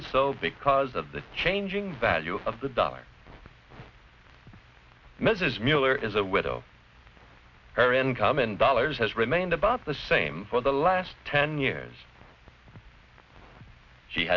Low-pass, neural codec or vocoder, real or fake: 7.2 kHz; none; real